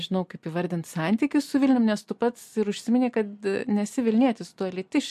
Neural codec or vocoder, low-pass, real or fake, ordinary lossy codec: none; 14.4 kHz; real; MP3, 64 kbps